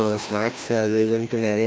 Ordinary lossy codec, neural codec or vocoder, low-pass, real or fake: none; codec, 16 kHz, 1 kbps, FreqCodec, larger model; none; fake